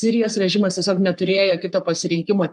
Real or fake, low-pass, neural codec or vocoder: fake; 10.8 kHz; codec, 44.1 kHz, 3.4 kbps, Pupu-Codec